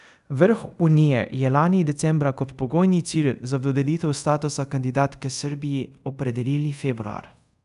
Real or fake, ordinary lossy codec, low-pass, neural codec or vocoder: fake; none; 10.8 kHz; codec, 24 kHz, 0.5 kbps, DualCodec